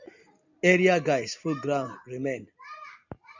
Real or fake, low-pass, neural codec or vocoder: real; 7.2 kHz; none